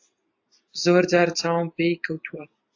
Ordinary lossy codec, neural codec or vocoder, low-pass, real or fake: AAC, 48 kbps; none; 7.2 kHz; real